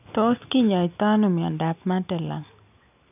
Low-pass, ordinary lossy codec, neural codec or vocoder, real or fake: 3.6 kHz; none; none; real